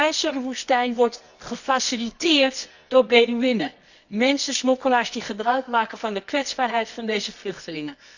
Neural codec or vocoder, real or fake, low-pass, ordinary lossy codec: codec, 24 kHz, 0.9 kbps, WavTokenizer, medium music audio release; fake; 7.2 kHz; none